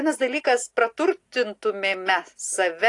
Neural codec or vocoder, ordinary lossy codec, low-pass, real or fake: none; AAC, 48 kbps; 10.8 kHz; real